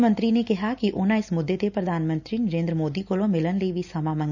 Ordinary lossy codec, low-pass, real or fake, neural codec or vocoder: none; 7.2 kHz; real; none